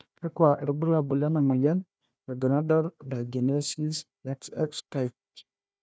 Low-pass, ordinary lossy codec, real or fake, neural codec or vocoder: none; none; fake; codec, 16 kHz, 1 kbps, FunCodec, trained on Chinese and English, 50 frames a second